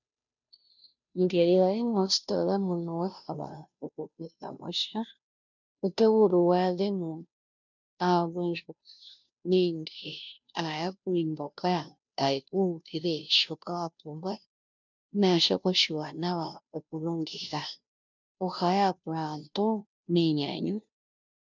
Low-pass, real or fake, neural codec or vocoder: 7.2 kHz; fake; codec, 16 kHz, 0.5 kbps, FunCodec, trained on Chinese and English, 25 frames a second